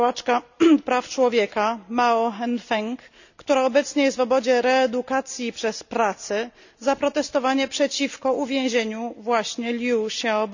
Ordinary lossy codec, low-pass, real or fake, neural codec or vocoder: none; 7.2 kHz; real; none